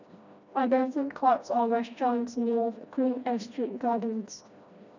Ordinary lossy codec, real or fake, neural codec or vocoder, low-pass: none; fake; codec, 16 kHz, 1 kbps, FreqCodec, smaller model; 7.2 kHz